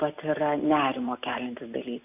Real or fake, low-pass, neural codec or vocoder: real; 3.6 kHz; none